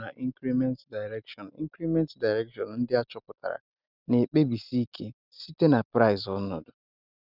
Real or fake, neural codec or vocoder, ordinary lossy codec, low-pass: real; none; none; 5.4 kHz